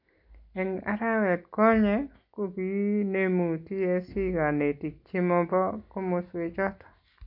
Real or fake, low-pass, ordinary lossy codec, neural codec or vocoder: real; 5.4 kHz; none; none